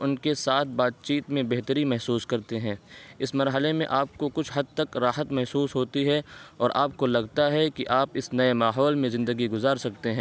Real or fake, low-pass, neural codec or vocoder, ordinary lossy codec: real; none; none; none